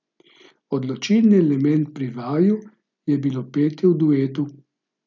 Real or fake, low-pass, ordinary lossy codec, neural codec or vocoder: real; 7.2 kHz; none; none